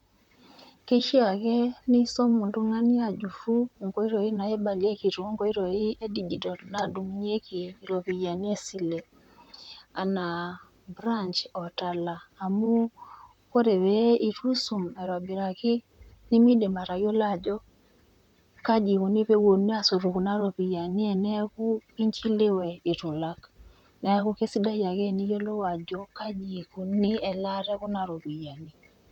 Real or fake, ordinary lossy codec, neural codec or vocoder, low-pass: fake; none; vocoder, 44.1 kHz, 128 mel bands, Pupu-Vocoder; 19.8 kHz